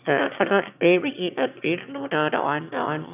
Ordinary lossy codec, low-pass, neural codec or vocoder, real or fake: none; 3.6 kHz; autoencoder, 22.05 kHz, a latent of 192 numbers a frame, VITS, trained on one speaker; fake